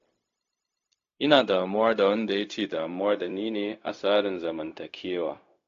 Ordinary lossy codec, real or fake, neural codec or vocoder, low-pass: AAC, 48 kbps; fake; codec, 16 kHz, 0.4 kbps, LongCat-Audio-Codec; 7.2 kHz